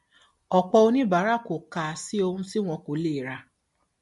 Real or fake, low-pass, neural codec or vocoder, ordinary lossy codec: real; 14.4 kHz; none; MP3, 48 kbps